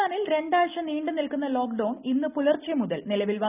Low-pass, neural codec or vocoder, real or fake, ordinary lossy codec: 3.6 kHz; none; real; none